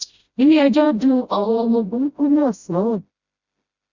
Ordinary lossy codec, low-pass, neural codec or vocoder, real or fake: Opus, 64 kbps; 7.2 kHz; codec, 16 kHz, 0.5 kbps, FreqCodec, smaller model; fake